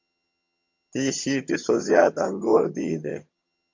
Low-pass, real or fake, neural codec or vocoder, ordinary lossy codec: 7.2 kHz; fake; vocoder, 22.05 kHz, 80 mel bands, HiFi-GAN; MP3, 48 kbps